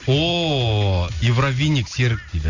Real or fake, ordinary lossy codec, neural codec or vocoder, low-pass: real; Opus, 64 kbps; none; 7.2 kHz